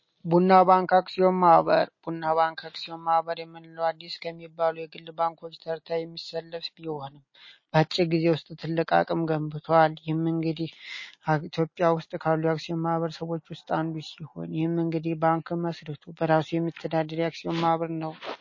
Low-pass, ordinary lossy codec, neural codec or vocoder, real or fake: 7.2 kHz; MP3, 32 kbps; none; real